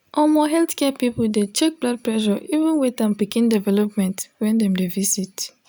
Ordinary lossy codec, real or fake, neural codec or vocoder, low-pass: none; real; none; none